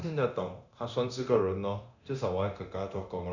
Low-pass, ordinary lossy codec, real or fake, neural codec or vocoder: 7.2 kHz; none; fake; codec, 24 kHz, 0.9 kbps, DualCodec